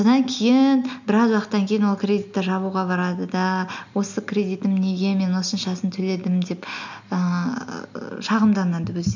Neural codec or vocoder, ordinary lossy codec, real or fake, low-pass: none; none; real; 7.2 kHz